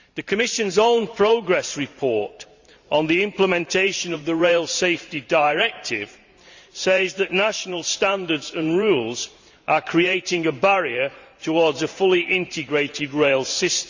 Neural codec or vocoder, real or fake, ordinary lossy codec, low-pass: vocoder, 44.1 kHz, 128 mel bands every 512 samples, BigVGAN v2; fake; Opus, 64 kbps; 7.2 kHz